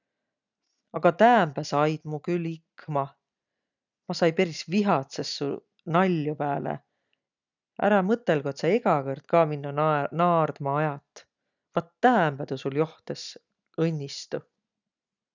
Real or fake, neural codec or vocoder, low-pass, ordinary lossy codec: real; none; 7.2 kHz; none